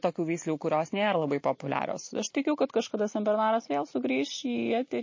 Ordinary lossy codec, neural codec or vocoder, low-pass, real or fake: MP3, 32 kbps; none; 7.2 kHz; real